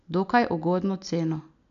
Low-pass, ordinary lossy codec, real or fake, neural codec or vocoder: 7.2 kHz; none; real; none